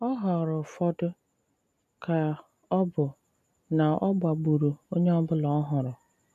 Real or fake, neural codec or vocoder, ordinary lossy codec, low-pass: real; none; none; 14.4 kHz